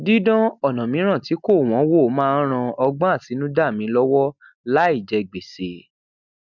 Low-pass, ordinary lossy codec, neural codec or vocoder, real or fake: 7.2 kHz; none; none; real